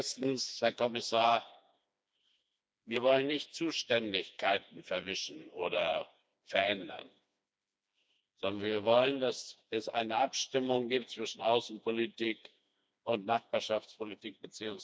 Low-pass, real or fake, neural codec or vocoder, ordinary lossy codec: none; fake; codec, 16 kHz, 2 kbps, FreqCodec, smaller model; none